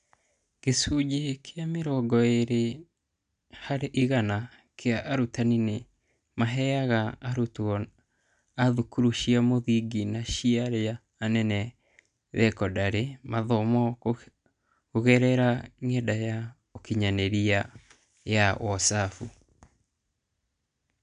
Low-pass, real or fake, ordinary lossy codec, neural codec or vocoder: 9.9 kHz; real; none; none